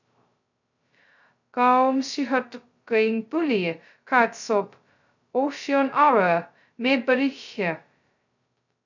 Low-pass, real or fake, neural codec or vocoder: 7.2 kHz; fake; codec, 16 kHz, 0.2 kbps, FocalCodec